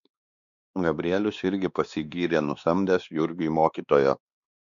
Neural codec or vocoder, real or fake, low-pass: codec, 16 kHz, 2 kbps, X-Codec, WavLM features, trained on Multilingual LibriSpeech; fake; 7.2 kHz